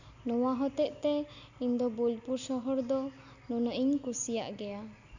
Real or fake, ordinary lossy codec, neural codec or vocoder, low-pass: real; none; none; 7.2 kHz